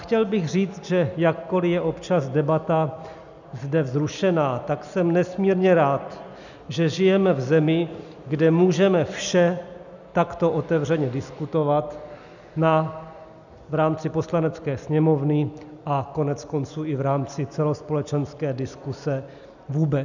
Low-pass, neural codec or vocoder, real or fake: 7.2 kHz; none; real